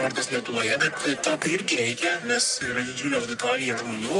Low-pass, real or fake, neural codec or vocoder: 10.8 kHz; fake; codec, 44.1 kHz, 1.7 kbps, Pupu-Codec